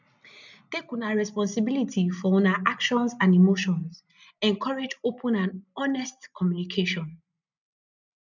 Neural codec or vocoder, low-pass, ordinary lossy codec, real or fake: none; 7.2 kHz; none; real